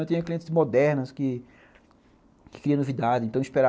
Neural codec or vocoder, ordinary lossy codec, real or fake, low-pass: none; none; real; none